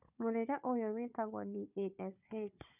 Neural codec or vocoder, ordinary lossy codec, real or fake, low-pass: codec, 16 kHz, 4 kbps, FunCodec, trained on Chinese and English, 50 frames a second; none; fake; 3.6 kHz